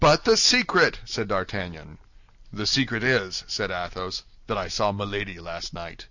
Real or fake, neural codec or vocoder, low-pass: real; none; 7.2 kHz